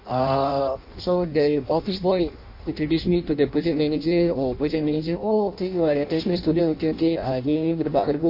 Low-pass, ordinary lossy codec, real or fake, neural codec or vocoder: 5.4 kHz; MP3, 32 kbps; fake; codec, 16 kHz in and 24 kHz out, 0.6 kbps, FireRedTTS-2 codec